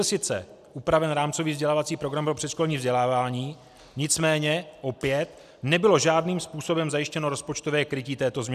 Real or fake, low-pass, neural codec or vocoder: real; 14.4 kHz; none